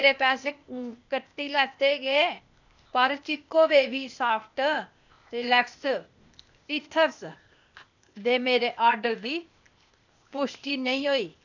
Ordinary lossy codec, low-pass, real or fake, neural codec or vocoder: none; 7.2 kHz; fake; codec, 16 kHz, 0.8 kbps, ZipCodec